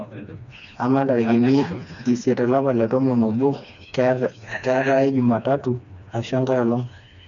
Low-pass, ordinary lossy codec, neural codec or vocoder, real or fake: 7.2 kHz; none; codec, 16 kHz, 2 kbps, FreqCodec, smaller model; fake